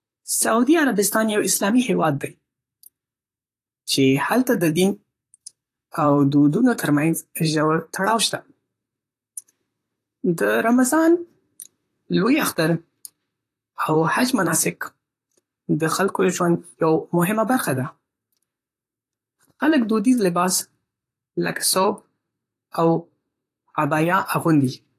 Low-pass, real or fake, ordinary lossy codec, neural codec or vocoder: 14.4 kHz; fake; AAC, 48 kbps; vocoder, 44.1 kHz, 128 mel bands, Pupu-Vocoder